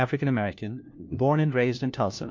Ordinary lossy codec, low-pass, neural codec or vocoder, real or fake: MP3, 48 kbps; 7.2 kHz; codec, 16 kHz, 1 kbps, FunCodec, trained on LibriTTS, 50 frames a second; fake